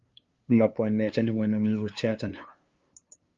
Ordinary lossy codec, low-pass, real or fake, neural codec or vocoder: Opus, 32 kbps; 7.2 kHz; fake; codec, 16 kHz, 2 kbps, FunCodec, trained on LibriTTS, 25 frames a second